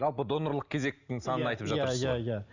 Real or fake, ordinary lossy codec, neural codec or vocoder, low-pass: real; Opus, 64 kbps; none; 7.2 kHz